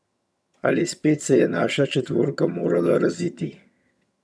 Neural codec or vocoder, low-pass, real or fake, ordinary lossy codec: vocoder, 22.05 kHz, 80 mel bands, HiFi-GAN; none; fake; none